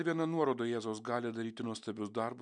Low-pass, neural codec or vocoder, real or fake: 9.9 kHz; none; real